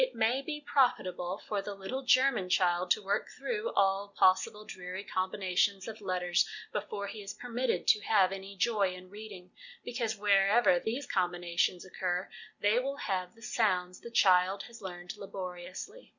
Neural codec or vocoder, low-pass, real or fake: none; 7.2 kHz; real